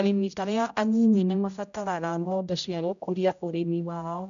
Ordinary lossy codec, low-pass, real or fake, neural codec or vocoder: none; 7.2 kHz; fake; codec, 16 kHz, 0.5 kbps, X-Codec, HuBERT features, trained on general audio